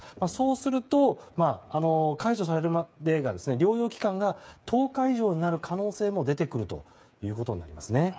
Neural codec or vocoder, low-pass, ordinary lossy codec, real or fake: codec, 16 kHz, 8 kbps, FreqCodec, smaller model; none; none; fake